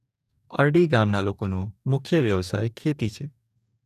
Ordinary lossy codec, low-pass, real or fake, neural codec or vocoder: none; 14.4 kHz; fake; codec, 44.1 kHz, 2.6 kbps, DAC